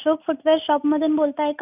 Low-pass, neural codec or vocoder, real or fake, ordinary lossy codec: 3.6 kHz; none; real; none